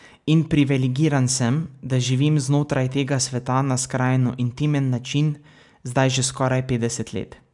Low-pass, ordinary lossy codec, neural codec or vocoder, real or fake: 10.8 kHz; none; none; real